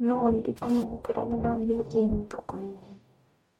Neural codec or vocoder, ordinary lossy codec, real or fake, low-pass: codec, 44.1 kHz, 0.9 kbps, DAC; MP3, 64 kbps; fake; 19.8 kHz